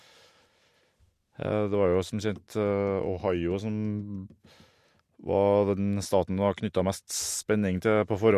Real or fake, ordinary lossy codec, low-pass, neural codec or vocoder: real; MP3, 64 kbps; 14.4 kHz; none